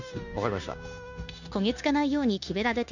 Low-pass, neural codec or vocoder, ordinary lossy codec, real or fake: 7.2 kHz; codec, 16 kHz, 0.9 kbps, LongCat-Audio-Codec; none; fake